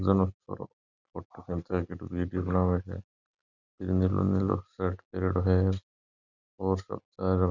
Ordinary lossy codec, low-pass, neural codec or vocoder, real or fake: none; 7.2 kHz; none; real